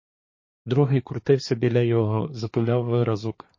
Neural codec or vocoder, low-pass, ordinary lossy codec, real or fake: codec, 24 kHz, 1 kbps, SNAC; 7.2 kHz; MP3, 32 kbps; fake